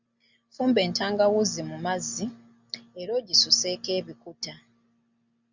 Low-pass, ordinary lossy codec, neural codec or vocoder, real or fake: 7.2 kHz; Opus, 64 kbps; none; real